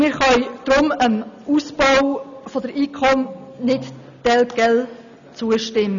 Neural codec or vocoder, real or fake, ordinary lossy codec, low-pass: none; real; none; 7.2 kHz